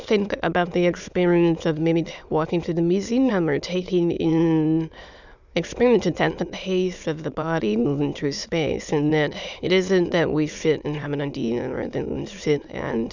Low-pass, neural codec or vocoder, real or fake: 7.2 kHz; autoencoder, 22.05 kHz, a latent of 192 numbers a frame, VITS, trained on many speakers; fake